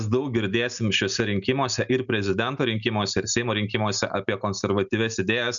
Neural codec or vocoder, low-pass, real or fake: none; 7.2 kHz; real